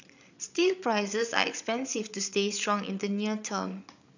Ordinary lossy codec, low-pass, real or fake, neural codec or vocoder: none; 7.2 kHz; fake; codec, 16 kHz, 8 kbps, FreqCodec, larger model